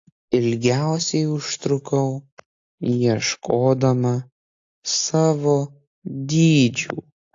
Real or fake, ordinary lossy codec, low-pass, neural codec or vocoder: real; AAC, 48 kbps; 7.2 kHz; none